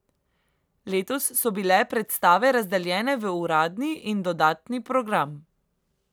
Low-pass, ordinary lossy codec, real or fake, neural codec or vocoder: none; none; real; none